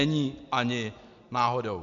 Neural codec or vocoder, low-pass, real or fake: none; 7.2 kHz; real